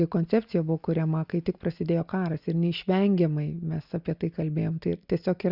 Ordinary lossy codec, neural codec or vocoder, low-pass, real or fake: Opus, 64 kbps; none; 5.4 kHz; real